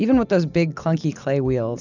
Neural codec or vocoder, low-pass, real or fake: none; 7.2 kHz; real